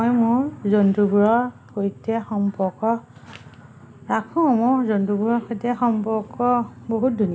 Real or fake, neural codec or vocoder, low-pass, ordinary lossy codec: real; none; none; none